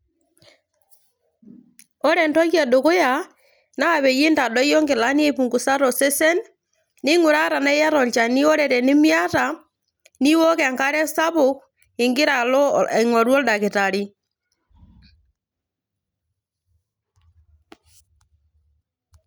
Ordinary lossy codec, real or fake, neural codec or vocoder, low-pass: none; real; none; none